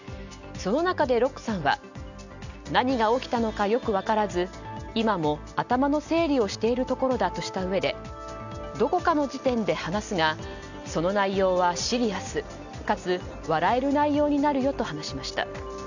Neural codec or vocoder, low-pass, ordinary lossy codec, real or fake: none; 7.2 kHz; none; real